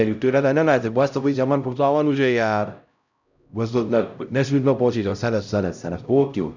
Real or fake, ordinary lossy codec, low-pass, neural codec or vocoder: fake; none; 7.2 kHz; codec, 16 kHz, 0.5 kbps, X-Codec, HuBERT features, trained on LibriSpeech